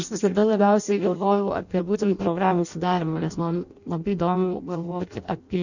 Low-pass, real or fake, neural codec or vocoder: 7.2 kHz; fake; codec, 16 kHz in and 24 kHz out, 0.6 kbps, FireRedTTS-2 codec